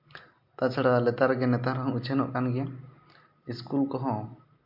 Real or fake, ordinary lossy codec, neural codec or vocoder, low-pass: real; MP3, 48 kbps; none; 5.4 kHz